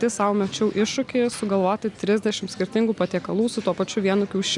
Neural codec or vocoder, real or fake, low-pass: none; real; 10.8 kHz